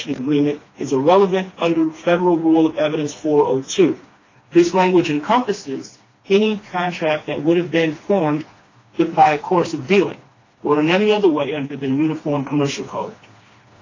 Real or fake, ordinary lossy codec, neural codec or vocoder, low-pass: fake; AAC, 32 kbps; codec, 16 kHz, 2 kbps, FreqCodec, smaller model; 7.2 kHz